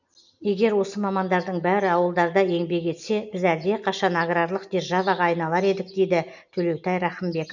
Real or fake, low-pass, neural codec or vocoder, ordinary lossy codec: real; 7.2 kHz; none; none